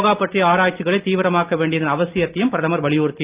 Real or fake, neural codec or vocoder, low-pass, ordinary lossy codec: real; none; 3.6 kHz; Opus, 32 kbps